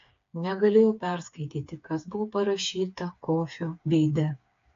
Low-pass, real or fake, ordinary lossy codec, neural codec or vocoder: 7.2 kHz; fake; AAC, 48 kbps; codec, 16 kHz, 4 kbps, FreqCodec, smaller model